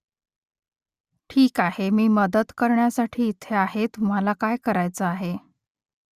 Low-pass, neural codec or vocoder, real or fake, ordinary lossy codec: 14.4 kHz; none; real; Opus, 64 kbps